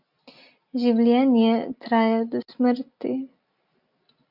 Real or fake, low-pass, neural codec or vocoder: real; 5.4 kHz; none